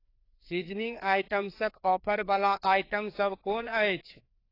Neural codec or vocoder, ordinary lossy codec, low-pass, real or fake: codec, 16 kHz, 2 kbps, FreqCodec, larger model; AAC, 32 kbps; 5.4 kHz; fake